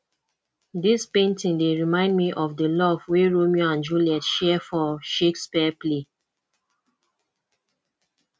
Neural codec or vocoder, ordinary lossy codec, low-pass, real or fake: none; none; none; real